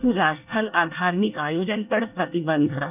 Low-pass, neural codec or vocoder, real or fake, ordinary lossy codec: 3.6 kHz; codec, 24 kHz, 1 kbps, SNAC; fake; none